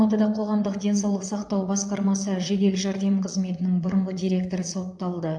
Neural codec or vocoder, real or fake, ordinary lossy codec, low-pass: vocoder, 22.05 kHz, 80 mel bands, Vocos; fake; AAC, 64 kbps; 9.9 kHz